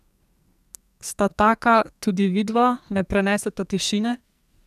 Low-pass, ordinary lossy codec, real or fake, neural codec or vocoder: 14.4 kHz; none; fake; codec, 44.1 kHz, 2.6 kbps, SNAC